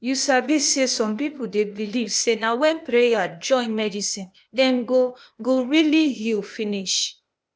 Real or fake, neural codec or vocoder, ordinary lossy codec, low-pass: fake; codec, 16 kHz, 0.8 kbps, ZipCodec; none; none